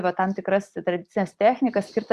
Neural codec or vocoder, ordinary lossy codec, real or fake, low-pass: vocoder, 44.1 kHz, 128 mel bands every 256 samples, BigVGAN v2; Opus, 64 kbps; fake; 14.4 kHz